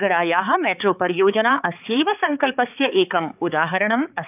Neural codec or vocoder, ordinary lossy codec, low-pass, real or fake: codec, 16 kHz, 4 kbps, X-Codec, HuBERT features, trained on balanced general audio; none; 3.6 kHz; fake